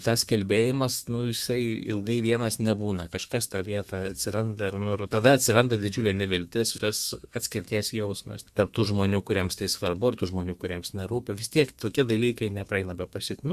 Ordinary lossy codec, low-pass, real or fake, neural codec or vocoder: Opus, 64 kbps; 14.4 kHz; fake; codec, 32 kHz, 1.9 kbps, SNAC